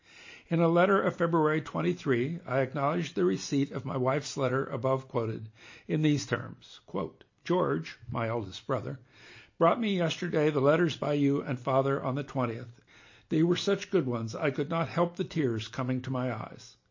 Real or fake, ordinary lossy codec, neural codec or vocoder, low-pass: real; MP3, 32 kbps; none; 7.2 kHz